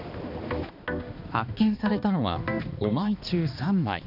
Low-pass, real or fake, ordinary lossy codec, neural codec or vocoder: 5.4 kHz; fake; none; codec, 16 kHz, 2 kbps, X-Codec, HuBERT features, trained on balanced general audio